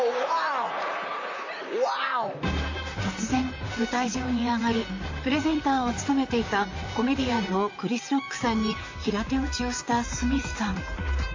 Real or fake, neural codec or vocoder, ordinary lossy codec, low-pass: fake; vocoder, 44.1 kHz, 128 mel bands, Pupu-Vocoder; AAC, 48 kbps; 7.2 kHz